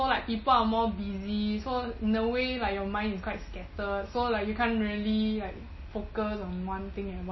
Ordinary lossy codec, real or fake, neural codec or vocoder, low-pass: MP3, 24 kbps; real; none; 7.2 kHz